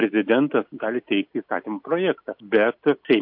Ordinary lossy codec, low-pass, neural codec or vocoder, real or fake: MP3, 48 kbps; 5.4 kHz; none; real